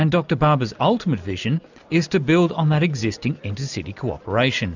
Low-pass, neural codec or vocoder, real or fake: 7.2 kHz; none; real